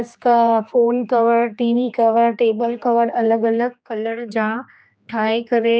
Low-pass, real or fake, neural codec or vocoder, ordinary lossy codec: none; fake; codec, 16 kHz, 2 kbps, X-Codec, HuBERT features, trained on general audio; none